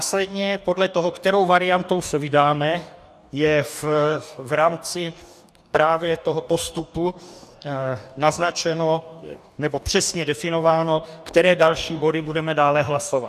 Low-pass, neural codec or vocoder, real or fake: 14.4 kHz; codec, 44.1 kHz, 2.6 kbps, DAC; fake